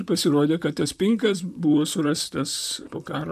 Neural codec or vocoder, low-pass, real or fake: vocoder, 44.1 kHz, 128 mel bands, Pupu-Vocoder; 14.4 kHz; fake